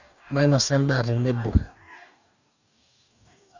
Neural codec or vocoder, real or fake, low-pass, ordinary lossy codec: codec, 44.1 kHz, 2.6 kbps, DAC; fake; 7.2 kHz; none